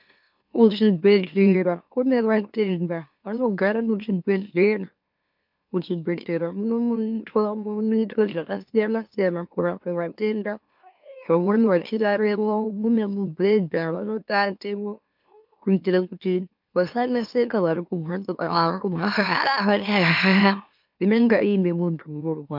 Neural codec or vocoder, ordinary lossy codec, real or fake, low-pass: autoencoder, 44.1 kHz, a latent of 192 numbers a frame, MeloTTS; MP3, 48 kbps; fake; 5.4 kHz